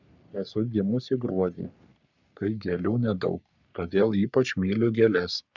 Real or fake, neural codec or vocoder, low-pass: fake; codec, 44.1 kHz, 3.4 kbps, Pupu-Codec; 7.2 kHz